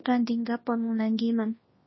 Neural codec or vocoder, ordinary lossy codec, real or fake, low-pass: codec, 24 kHz, 0.9 kbps, WavTokenizer, large speech release; MP3, 24 kbps; fake; 7.2 kHz